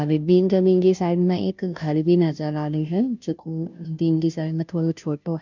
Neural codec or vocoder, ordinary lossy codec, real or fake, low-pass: codec, 16 kHz, 0.5 kbps, FunCodec, trained on Chinese and English, 25 frames a second; none; fake; 7.2 kHz